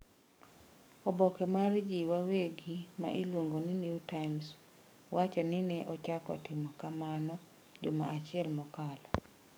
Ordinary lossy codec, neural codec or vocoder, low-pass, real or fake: none; codec, 44.1 kHz, 7.8 kbps, DAC; none; fake